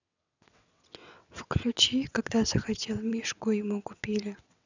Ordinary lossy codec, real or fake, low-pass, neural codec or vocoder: none; fake; 7.2 kHz; vocoder, 22.05 kHz, 80 mel bands, WaveNeXt